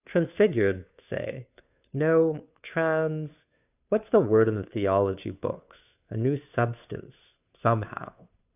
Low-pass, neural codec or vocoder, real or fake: 3.6 kHz; codec, 16 kHz, 2 kbps, FunCodec, trained on Chinese and English, 25 frames a second; fake